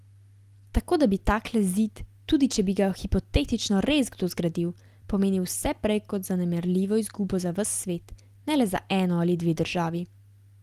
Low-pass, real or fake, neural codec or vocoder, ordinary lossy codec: 14.4 kHz; real; none; Opus, 24 kbps